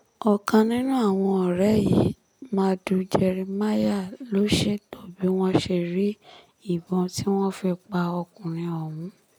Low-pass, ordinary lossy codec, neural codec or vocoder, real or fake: none; none; none; real